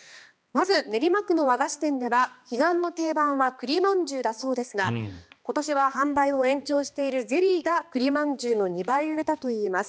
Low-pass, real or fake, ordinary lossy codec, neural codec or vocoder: none; fake; none; codec, 16 kHz, 2 kbps, X-Codec, HuBERT features, trained on balanced general audio